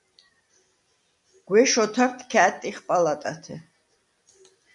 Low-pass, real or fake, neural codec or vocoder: 10.8 kHz; real; none